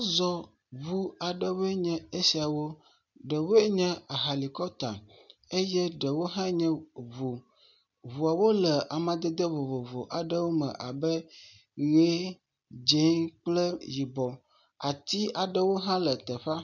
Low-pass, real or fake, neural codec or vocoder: 7.2 kHz; real; none